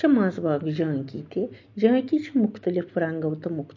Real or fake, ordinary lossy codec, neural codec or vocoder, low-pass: real; MP3, 48 kbps; none; 7.2 kHz